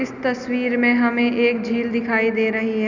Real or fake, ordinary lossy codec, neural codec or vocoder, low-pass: real; none; none; 7.2 kHz